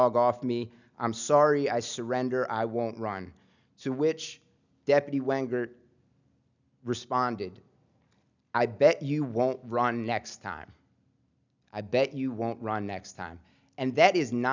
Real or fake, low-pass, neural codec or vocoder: real; 7.2 kHz; none